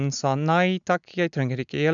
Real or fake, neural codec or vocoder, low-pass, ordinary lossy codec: real; none; 7.2 kHz; none